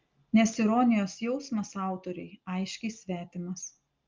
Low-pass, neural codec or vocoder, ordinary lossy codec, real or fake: 7.2 kHz; none; Opus, 24 kbps; real